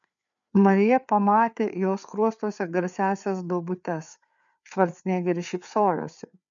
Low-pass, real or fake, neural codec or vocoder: 7.2 kHz; fake; codec, 16 kHz, 4 kbps, FreqCodec, larger model